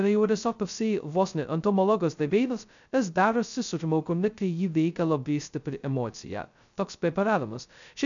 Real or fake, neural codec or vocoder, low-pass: fake; codec, 16 kHz, 0.2 kbps, FocalCodec; 7.2 kHz